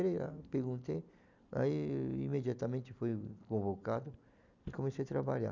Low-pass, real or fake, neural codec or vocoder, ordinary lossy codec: 7.2 kHz; real; none; none